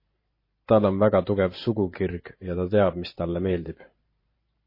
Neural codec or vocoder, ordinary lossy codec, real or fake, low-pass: vocoder, 44.1 kHz, 128 mel bands every 512 samples, BigVGAN v2; MP3, 24 kbps; fake; 5.4 kHz